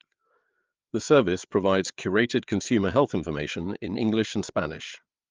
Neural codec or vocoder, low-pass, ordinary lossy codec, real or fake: codec, 16 kHz, 4 kbps, FreqCodec, larger model; 7.2 kHz; Opus, 32 kbps; fake